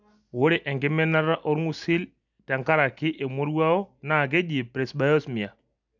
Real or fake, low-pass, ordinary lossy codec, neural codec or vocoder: real; 7.2 kHz; none; none